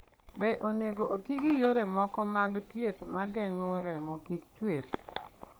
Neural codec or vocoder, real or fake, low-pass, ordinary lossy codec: codec, 44.1 kHz, 3.4 kbps, Pupu-Codec; fake; none; none